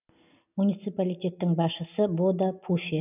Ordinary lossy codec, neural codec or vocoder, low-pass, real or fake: none; none; 3.6 kHz; real